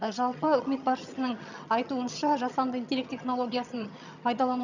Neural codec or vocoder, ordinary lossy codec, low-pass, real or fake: vocoder, 22.05 kHz, 80 mel bands, HiFi-GAN; none; 7.2 kHz; fake